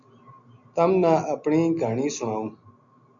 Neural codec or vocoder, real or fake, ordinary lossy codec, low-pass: none; real; MP3, 96 kbps; 7.2 kHz